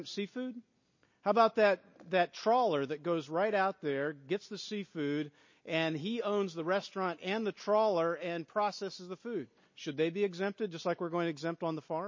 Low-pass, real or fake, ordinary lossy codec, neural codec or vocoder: 7.2 kHz; real; MP3, 32 kbps; none